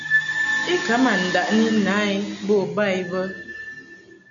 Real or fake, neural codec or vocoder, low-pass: real; none; 7.2 kHz